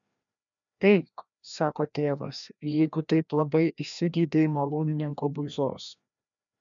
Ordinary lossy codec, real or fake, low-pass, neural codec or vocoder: MP3, 96 kbps; fake; 7.2 kHz; codec, 16 kHz, 1 kbps, FreqCodec, larger model